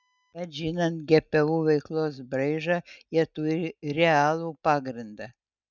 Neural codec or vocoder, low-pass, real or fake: none; 7.2 kHz; real